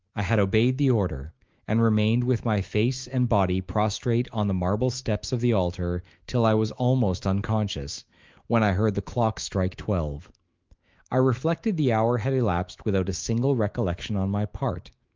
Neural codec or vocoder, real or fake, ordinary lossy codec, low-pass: autoencoder, 48 kHz, 128 numbers a frame, DAC-VAE, trained on Japanese speech; fake; Opus, 32 kbps; 7.2 kHz